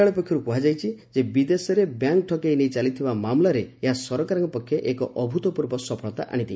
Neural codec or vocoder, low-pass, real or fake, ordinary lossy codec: none; none; real; none